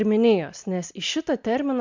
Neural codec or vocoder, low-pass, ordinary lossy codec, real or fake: none; 7.2 kHz; MP3, 64 kbps; real